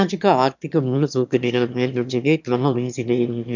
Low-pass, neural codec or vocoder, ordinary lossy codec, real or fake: 7.2 kHz; autoencoder, 22.05 kHz, a latent of 192 numbers a frame, VITS, trained on one speaker; none; fake